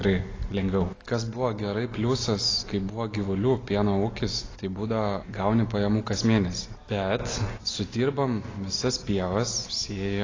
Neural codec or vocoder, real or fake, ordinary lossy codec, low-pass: none; real; AAC, 32 kbps; 7.2 kHz